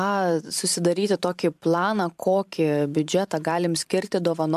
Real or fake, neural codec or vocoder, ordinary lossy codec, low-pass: fake; vocoder, 44.1 kHz, 128 mel bands every 512 samples, BigVGAN v2; MP3, 96 kbps; 14.4 kHz